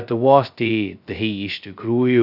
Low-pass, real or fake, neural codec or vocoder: 5.4 kHz; fake; codec, 16 kHz, 0.2 kbps, FocalCodec